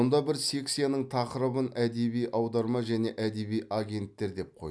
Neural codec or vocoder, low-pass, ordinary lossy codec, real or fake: none; none; none; real